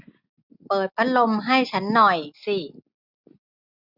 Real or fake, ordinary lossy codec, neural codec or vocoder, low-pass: real; none; none; 5.4 kHz